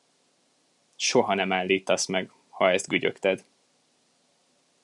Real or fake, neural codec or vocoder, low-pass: real; none; 10.8 kHz